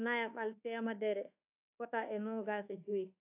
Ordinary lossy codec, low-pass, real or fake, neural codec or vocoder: AAC, 32 kbps; 3.6 kHz; fake; codec, 24 kHz, 1.2 kbps, DualCodec